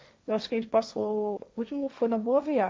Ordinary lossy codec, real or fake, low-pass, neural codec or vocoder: none; fake; none; codec, 16 kHz, 1.1 kbps, Voila-Tokenizer